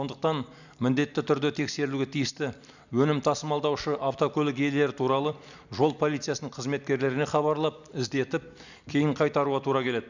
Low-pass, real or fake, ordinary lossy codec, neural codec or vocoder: 7.2 kHz; real; none; none